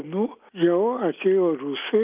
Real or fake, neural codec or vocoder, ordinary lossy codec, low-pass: real; none; Opus, 24 kbps; 3.6 kHz